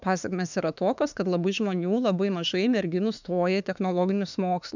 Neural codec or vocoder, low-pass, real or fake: autoencoder, 48 kHz, 32 numbers a frame, DAC-VAE, trained on Japanese speech; 7.2 kHz; fake